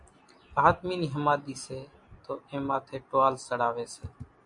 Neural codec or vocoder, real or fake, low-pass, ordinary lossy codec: none; real; 10.8 kHz; MP3, 64 kbps